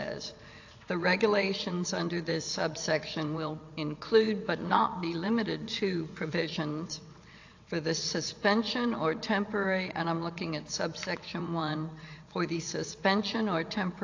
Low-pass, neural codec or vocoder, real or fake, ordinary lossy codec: 7.2 kHz; vocoder, 22.05 kHz, 80 mel bands, WaveNeXt; fake; AAC, 48 kbps